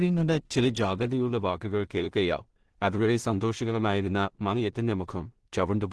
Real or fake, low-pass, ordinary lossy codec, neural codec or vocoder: fake; 10.8 kHz; Opus, 16 kbps; codec, 16 kHz in and 24 kHz out, 0.4 kbps, LongCat-Audio-Codec, two codebook decoder